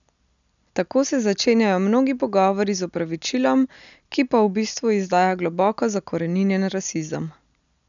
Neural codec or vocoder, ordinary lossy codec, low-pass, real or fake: none; none; 7.2 kHz; real